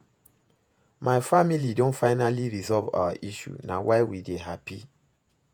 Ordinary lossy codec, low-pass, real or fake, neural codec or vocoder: none; none; fake; vocoder, 48 kHz, 128 mel bands, Vocos